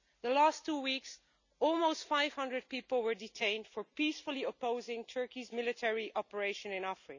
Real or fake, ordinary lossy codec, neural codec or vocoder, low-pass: real; none; none; 7.2 kHz